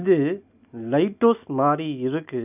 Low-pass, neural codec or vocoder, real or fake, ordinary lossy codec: 3.6 kHz; none; real; none